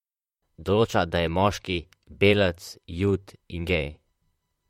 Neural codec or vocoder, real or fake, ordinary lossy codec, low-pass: vocoder, 44.1 kHz, 128 mel bands, Pupu-Vocoder; fake; MP3, 64 kbps; 19.8 kHz